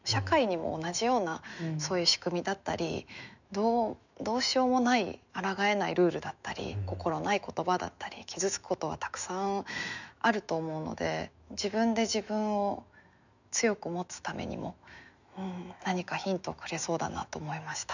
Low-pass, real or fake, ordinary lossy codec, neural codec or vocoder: 7.2 kHz; fake; none; autoencoder, 48 kHz, 128 numbers a frame, DAC-VAE, trained on Japanese speech